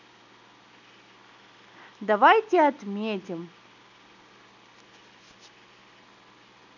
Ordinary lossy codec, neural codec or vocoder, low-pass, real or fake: none; none; 7.2 kHz; real